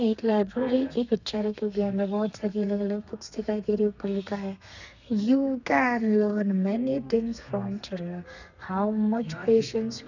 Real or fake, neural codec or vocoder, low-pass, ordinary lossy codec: fake; codec, 32 kHz, 1.9 kbps, SNAC; 7.2 kHz; none